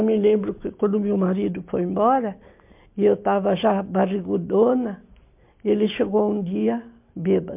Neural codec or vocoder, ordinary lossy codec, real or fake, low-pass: none; MP3, 32 kbps; real; 3.6 kHz